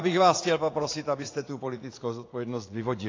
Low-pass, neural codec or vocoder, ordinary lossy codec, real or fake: 7.2 kHz; vocoder, 44.1 kHz, 80 mel bands, Vocos; AAC, 32 kbps; fake